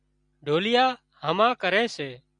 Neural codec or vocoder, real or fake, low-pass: none; real; 9.9 kHz